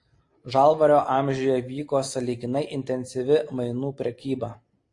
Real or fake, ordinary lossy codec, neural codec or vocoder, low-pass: real; AAC, 48 kbps; none; 10.8 kHz